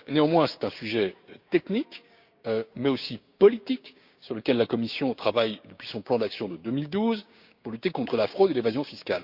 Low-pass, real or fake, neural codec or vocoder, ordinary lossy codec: 5.4 kHz; fake; codec, 16 kHz, 6 kbps, DAC; Opus, 64 kbps